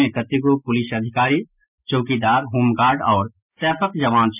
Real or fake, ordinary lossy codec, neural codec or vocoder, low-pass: real; none; none; 3.6 kHz